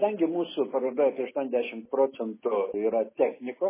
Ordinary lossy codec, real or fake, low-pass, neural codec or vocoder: MP3, 16 kbps; real; 3.6 kHz; none